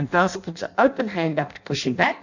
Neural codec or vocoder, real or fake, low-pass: codec, 16 kHz in and 24 kHz out, 0.6 kbps, FireRedTTS-2 codec; fake; 7.2 kHz